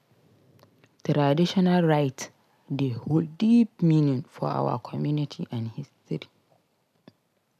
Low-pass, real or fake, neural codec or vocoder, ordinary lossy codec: 14.4 kHz; real; none; none